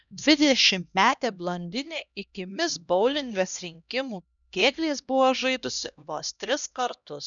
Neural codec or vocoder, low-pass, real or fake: codec, 16 kHz, 1 kbps, X-Codec, HuBERT features, trained on LibriSpeech; 7.2 kHz; fake